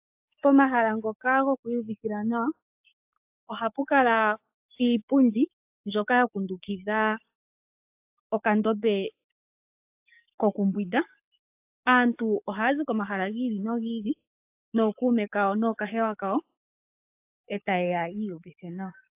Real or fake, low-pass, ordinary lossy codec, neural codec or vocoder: fake; 3.6 kHz; AAC, 32 kbps; codec, 44.1 kHz, 7.8 kbps, DAC